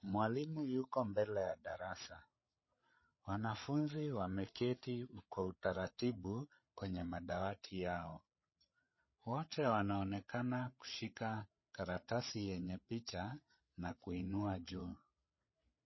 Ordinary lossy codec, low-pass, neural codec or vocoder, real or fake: MP3, 24 kbps; 7.2 kHz; codec, 16 kHz, 8 kbps, FreqCodec, larger model; fake